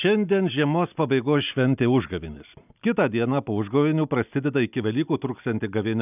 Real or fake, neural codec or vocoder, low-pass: real; none; 3.6 kHz